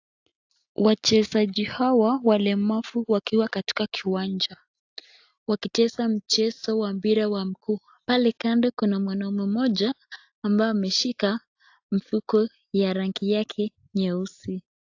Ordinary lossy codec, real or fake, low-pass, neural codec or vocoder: AAC, 48 kbps; real; 7.2 kHz; none